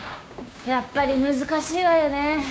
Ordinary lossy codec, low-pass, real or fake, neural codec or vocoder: none; none; fake; codec, 16 kHz, 6 kbps, DAC